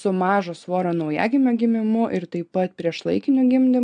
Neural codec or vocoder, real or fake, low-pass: none; real; 9.9 kHz